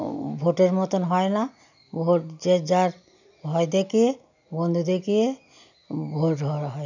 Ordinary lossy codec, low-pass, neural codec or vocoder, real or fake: none; 7.2 kHz; none; real